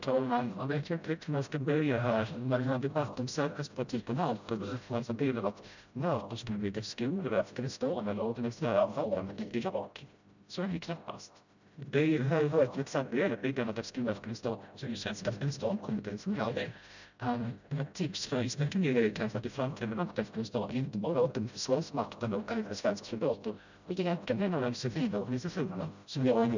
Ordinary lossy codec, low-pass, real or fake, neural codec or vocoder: none; 7.2 kHz; fake; codec, 16 kHz, 0.5 kbps, FreqCodec, smaller model